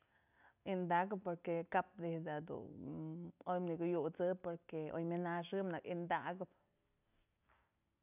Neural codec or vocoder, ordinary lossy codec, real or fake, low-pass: none; none; real; 3.6 kHz